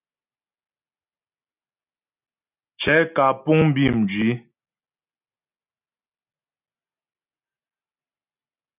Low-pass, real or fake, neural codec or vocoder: 3.6 kHz; real; none